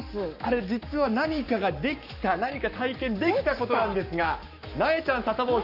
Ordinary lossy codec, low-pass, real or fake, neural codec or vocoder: none; 5.4 kHz; fake; codec, 44.1 kHz, 7.8 kbps, Pupu-Codec